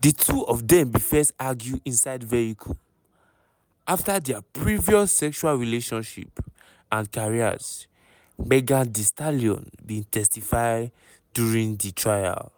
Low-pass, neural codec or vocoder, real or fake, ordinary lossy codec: none; none; real; none